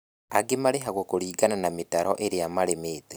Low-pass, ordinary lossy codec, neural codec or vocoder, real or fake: none; none; none; real